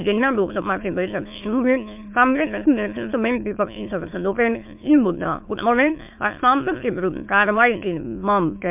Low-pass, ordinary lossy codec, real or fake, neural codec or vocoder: 3.6 kHz; MP3, 32 kbps; fake; autoencoder, 22.05 kHz, a latent of 192 numbers a frame, VITS, trained on many speakers